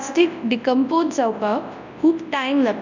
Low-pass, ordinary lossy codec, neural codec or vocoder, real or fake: 7.2 kHz; none; codec, 24 kHz, 0.9 kbps, WavTokenizer, large speech release; fake